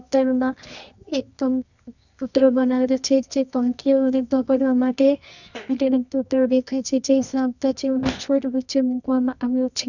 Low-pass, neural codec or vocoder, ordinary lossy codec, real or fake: 7.2 kHz; codec, 24 kHz, 0.9 kbps, WavTokenizer, medium music audio release; none; fake